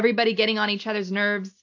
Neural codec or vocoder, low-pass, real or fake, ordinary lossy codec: none; 7.2 kHz; real; AAC, 48 kbps